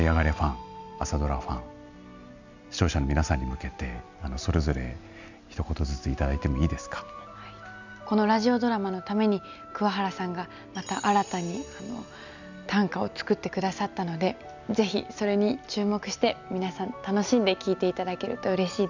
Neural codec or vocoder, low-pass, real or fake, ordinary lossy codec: none; 7.2 kHz; real; none